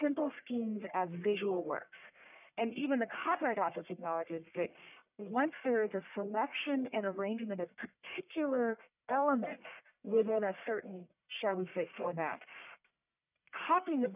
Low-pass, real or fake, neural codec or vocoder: 3.6 kHz; fake; codec, 44.1 kHz, 1.7 kbps, Pupu-Codec